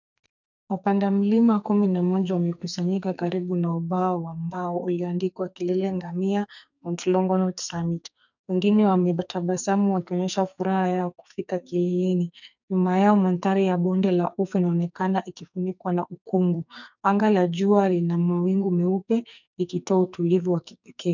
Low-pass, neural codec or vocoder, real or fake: 7.2 kHz; codec, 44.1 kHz, 2.6 kbps, SNAC; fake